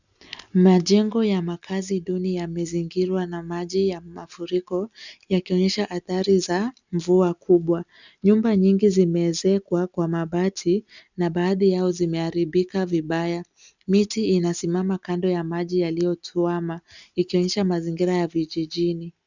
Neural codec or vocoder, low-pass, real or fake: none; 7.2 kHz; real